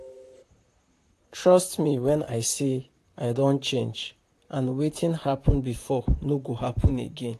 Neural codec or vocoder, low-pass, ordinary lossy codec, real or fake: vocoder, 44.1 kHz, 128 mel bands, Pupu-Vocoder; 14.4 kHz; AAC, 64 kbps; fake